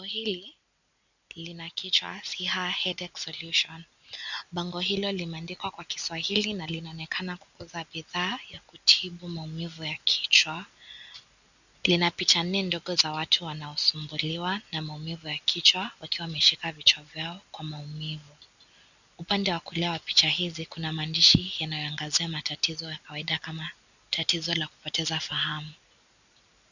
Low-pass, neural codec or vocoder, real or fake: 7.2 kHz; none; real